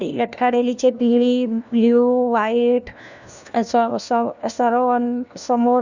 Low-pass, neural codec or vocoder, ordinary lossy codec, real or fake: 7.2 kHz; codec, 16 kHz, 1 kbps, FunCodec, trained on LibriTTS, 50 frames a second; none; fake